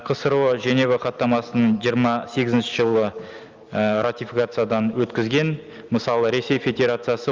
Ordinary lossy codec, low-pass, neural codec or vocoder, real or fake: Opus, 24 kbps; 7.2 kHz; none; real